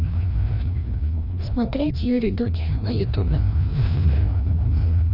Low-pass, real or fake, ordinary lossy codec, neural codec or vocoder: 5.4 kHz; fake; none; codec, 16 kHz, 1 kbps, FreqCodec, larger model